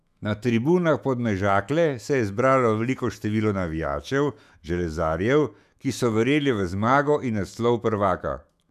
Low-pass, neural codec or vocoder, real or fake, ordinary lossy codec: 14.4 kHz; autoencoder, 48 kHz, 128 numbers a frame, DAC-VAE, trained on Japanese speech; fake; none